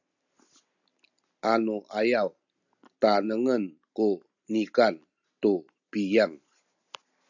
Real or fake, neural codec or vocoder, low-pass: real; none; 7.2 kHz